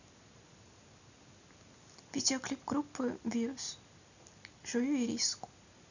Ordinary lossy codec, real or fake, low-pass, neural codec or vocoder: none; real; 7.2 kHz; none